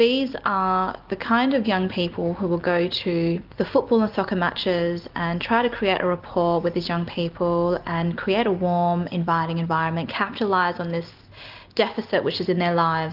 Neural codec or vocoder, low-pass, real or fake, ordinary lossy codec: none; 5.4 kHz; real; Opus, 24 kbps